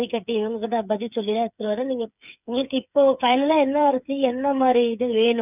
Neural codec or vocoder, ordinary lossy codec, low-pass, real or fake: codec, 16 kHz, 8 kbps, FreqCodec, smaller model; none; 3.6 kHz; fake